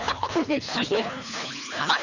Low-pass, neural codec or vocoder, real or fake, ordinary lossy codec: 7.2 kHz; codec, 24 kHz, 3 kbps, HILCodec; fake; none